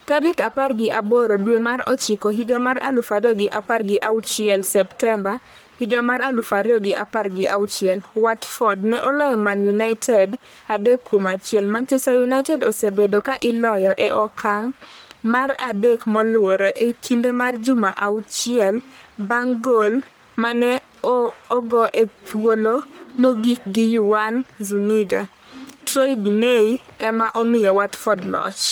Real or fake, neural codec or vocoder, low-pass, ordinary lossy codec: fake; codec, 44.1 kHz, 1.7 kbps, Pupu-Codec; none; none